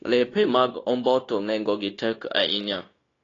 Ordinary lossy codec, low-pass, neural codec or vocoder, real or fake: AAC, 32 kbps; 7.2 kHz; codec, 16 kHz, 0.9 kbps, LongCat-Audio-Codec; fake